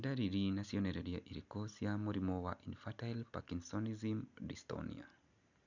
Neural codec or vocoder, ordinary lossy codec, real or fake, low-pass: none; none; real; 7.2 kHz